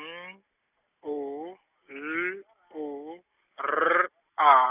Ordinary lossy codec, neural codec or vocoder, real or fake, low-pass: none; none; real; 3.6 kHz